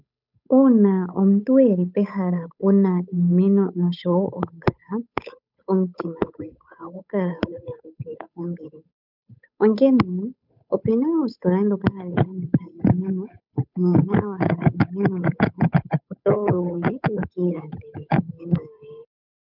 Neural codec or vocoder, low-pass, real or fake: codec, 16 kHz, 8 kbps, FunCodec, trained on Chinese and English, 25 frames a second; 5.4 kHz; fake